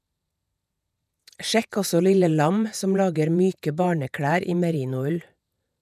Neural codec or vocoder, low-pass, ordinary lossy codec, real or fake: vocoder, 48 kHz, 128 mel bands, Vocos; 14.4 kHz; none; fake